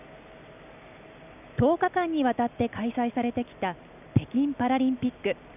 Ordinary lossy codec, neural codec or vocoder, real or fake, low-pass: none; none; real; 3.6 kHz